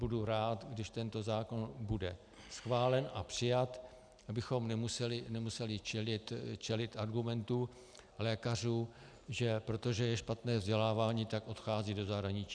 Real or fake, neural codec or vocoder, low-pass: real; none; 9.9 kHz